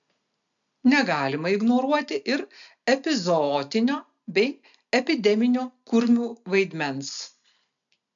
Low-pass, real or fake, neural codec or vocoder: 7.2 kHz; real; none